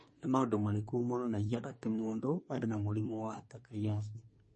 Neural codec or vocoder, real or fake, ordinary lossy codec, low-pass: codec, 24 kHz, 1 kbps, SNAC; fake; MP3, 32 kbps; 10.8 kHz